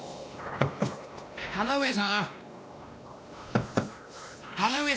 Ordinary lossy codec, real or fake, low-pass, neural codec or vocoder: none; fake; none; codec, 16 kHz, 1 kbps, X-Codec, WavLM features, trained on Multilingual LibriSpeech